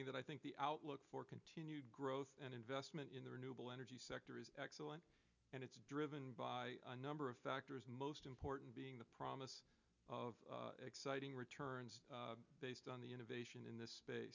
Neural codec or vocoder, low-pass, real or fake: none; 7.2 kHz; real